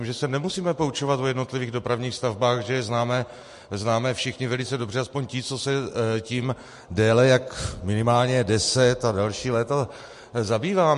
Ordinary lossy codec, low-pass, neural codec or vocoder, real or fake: MP3, 48 kbps; 14.4 kHz; none; real